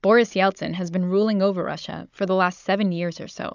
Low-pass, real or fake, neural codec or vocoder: 7.2 kHz; fake; codec, 16 kHz, 16 kbps, FunCodec, trained on Chinese and English, 50 frames a second